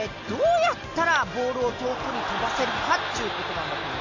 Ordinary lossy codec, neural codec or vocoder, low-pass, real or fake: none; none; 7.2 kHz; real